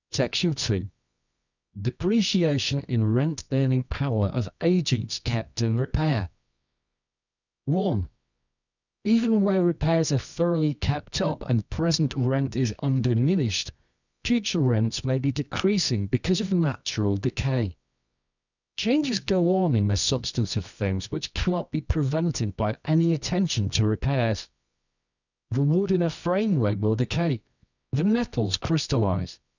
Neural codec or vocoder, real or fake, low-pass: codec, 24 kHz, 0.9 kbps, WavTokenizer, medium music audio release; fake; 7.2 kHz